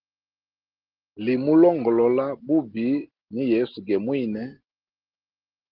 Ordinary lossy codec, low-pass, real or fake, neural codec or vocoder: Opus, 16 kbps; 5.4 kHz; real; none